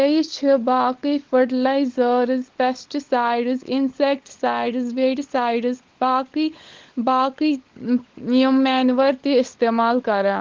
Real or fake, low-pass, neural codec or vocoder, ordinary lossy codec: fake; 7.2 kHz; codec, 16 kHz, 16 kbps, FunCodec, trained on LibriTTS, 50 frames a second; Opus, 16 kbps